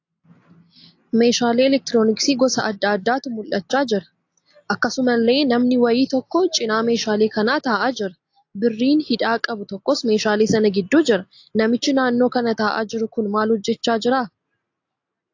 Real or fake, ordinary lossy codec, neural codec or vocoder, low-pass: real; AAC, 48 kbps; none; 7.2 kHz